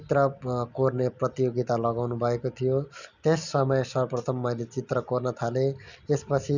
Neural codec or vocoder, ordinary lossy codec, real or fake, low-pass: none; none; real; 7.2 kHz